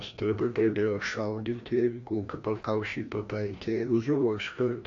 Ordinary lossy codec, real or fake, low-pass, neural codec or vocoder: AAC, 48 kbps; fake; 7.2 kHz; codec, 16 kHz, 1 kbps, FreqCodec, larger model